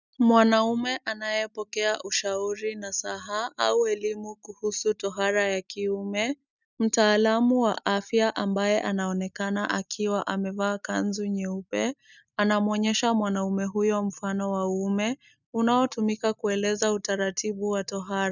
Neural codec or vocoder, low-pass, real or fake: none; 7.2 kHz; real